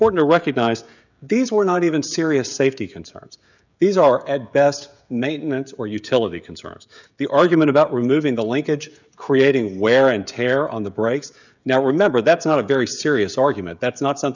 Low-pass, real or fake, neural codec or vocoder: 7.2 kHz; fake; codec, 16 kHz, 16 kbps, FreqCodec, smaller model